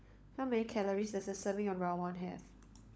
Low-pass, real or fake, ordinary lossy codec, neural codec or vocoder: none; fake; none; codec, 16 kHz, 16 kbps, FunCodec, trained on LibriTTS, 50 frames a second